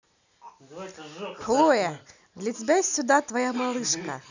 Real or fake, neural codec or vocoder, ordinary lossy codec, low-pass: real; none; none; 7.2 kHz